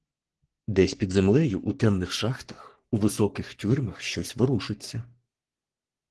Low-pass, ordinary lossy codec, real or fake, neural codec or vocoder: 10.8 kHz; Opus, 16 kbps; fake; codec, 44.1 kHz, 3.4 kbps, Pupu-Codec